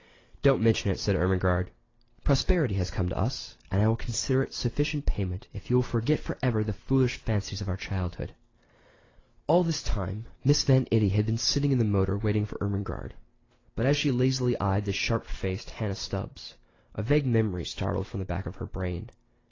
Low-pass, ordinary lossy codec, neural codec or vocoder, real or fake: 7.2 kHz; AAC, 32 kbps; none; real